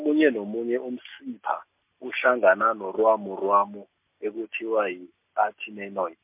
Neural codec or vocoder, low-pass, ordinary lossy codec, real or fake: none; 3.6 kHz; MP3, 32 kbps; real